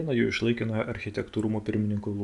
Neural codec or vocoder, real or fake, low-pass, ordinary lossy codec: none; real; 10.8 kHz; AAC, 64 kbps